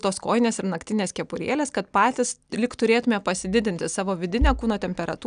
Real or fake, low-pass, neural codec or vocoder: real; 9.9 kHz; none